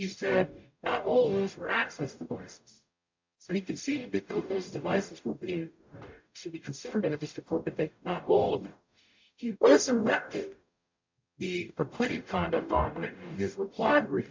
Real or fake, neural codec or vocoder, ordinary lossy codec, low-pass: fake; codec, 44.1 kHz, 0.9 kbps, DAC; MP3, 48 kbps; 7.2 kHz